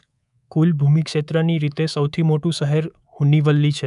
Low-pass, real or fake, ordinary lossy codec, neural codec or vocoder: 10.8 kHz; fake; none; codec, 24 kHz, 3.1 kbps, DualCodec